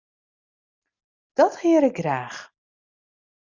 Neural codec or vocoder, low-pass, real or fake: none; 7.2 kHz; real